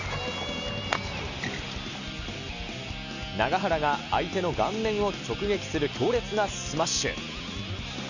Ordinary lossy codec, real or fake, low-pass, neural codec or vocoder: none; real; 7.2 kHz; none